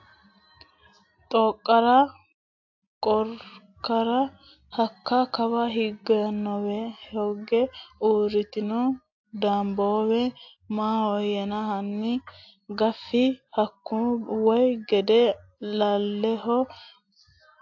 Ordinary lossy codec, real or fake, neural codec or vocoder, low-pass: AAC, 48 kbps; real; none; 7.2 kHz